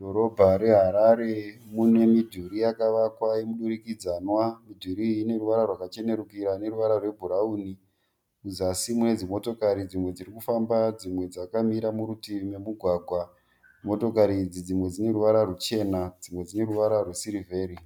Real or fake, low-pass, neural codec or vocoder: real; 19.8 kHz; none